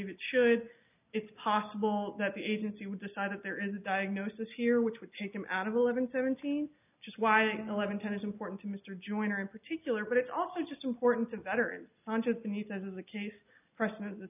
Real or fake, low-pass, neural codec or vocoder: real; 3.6 kHz; none